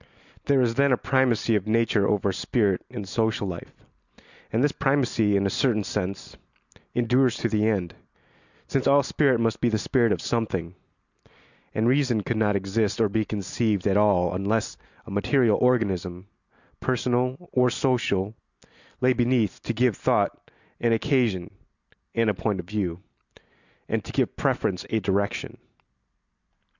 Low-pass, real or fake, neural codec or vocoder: 7.2 kHz; real; none